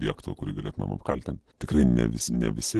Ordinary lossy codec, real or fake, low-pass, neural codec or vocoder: Opus, 16 kbps; real; 10.8 kHz; none